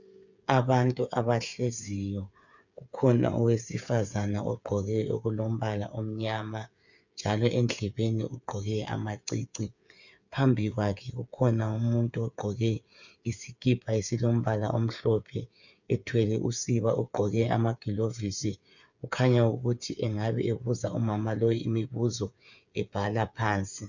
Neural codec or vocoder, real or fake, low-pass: codec, 16 kHz, 8 kbps, FreqCodec, smaller model; fake; 7.2 kHz